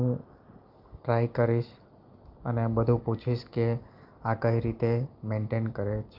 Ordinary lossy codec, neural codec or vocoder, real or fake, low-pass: Opus, 32 kbps; none; real; 5.4 kHz